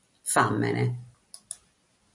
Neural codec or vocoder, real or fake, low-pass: none; real; 10.8 kHz